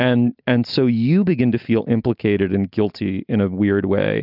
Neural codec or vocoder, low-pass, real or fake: none; 5.4 kHz; real